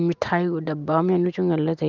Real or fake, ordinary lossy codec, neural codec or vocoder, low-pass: real; Opus, 24 kbps; none; 7.2 kHz